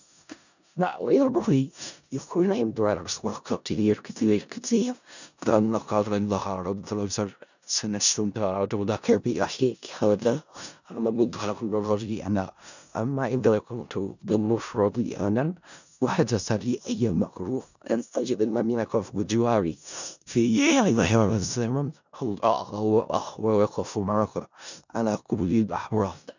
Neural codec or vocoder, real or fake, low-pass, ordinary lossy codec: codec, 16 kHz in and 24 kHz out, 0.4 kbps, LongCat-Audio-Codec, four codebook decoder; fake; 7.2 kHz; none